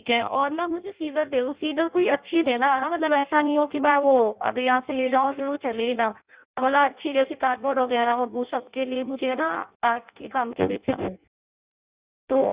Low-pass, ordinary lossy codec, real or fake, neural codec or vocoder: 3.6 kHz; Opus, 64 kbps; fake; codec, 16 kHz in and 24 kHz out, 0.6 kbps, FireRedTTS-2 codec